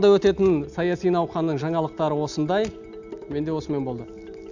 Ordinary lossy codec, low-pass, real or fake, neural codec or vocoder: none; 7.2 kHz; real; none